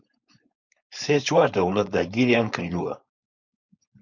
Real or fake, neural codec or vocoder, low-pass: fake; codec, 16 kHz, 4.8 kbps, FACodec; 7.2 kHz